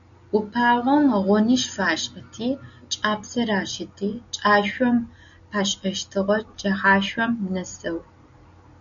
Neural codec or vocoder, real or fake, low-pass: none; real; 7.2 kHz